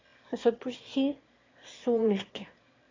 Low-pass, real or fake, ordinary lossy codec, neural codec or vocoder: 7.2 kHz; fake; AAC, 32 kbps; autoencoder, 22.05 kHz, a latent of 192 numbers a frame, VITS, trained on one speaker